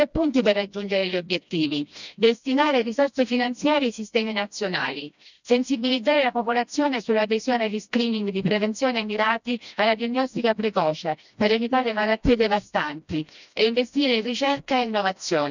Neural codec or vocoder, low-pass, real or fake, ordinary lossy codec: codec, 16 kHz, 1 kbps, FreqCodec, smaller model; 7.2 kHz; fake; none